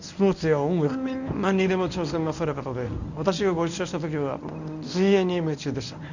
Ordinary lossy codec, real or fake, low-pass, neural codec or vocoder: none; fake; 7.2 kHz; codec, 24 kHz, 0.9 kbps, WavTokenizer, medium speech release version 1